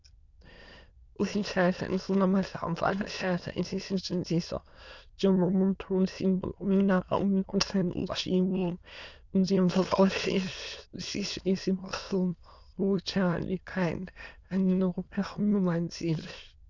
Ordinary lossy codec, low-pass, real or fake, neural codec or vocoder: Opus, 64 kbps; 7.2 kHz; fake; autoencoder, 22.05 kHz, a latent of 192 numbers a frame, VITS, trained on many speakers